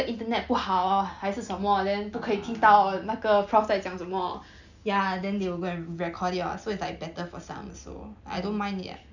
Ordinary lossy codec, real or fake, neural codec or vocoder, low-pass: none; real; none; 7.2 kHz